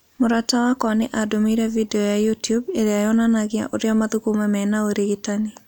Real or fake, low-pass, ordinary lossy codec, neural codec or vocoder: real; none; none; none